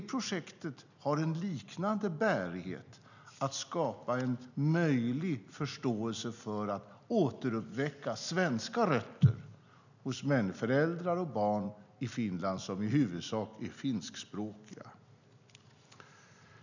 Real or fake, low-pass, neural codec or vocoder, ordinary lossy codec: real; 7.2 kHz; none; none